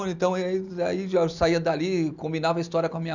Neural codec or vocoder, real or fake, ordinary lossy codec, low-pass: none; real; none; 7.2 kHz